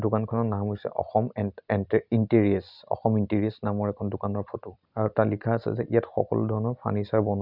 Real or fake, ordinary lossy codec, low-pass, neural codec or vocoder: real; none; 5.4 kHz; none